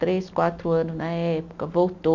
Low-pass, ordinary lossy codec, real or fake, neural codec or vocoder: 7.2 kHz; none; real; none